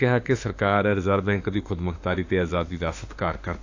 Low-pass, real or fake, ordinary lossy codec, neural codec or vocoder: 7.2 kHz; fake; AAC, 48 kbps; autoencoder, 48 kHz, 32 numbers a frame, DAC-VAE, trained on Japanese speech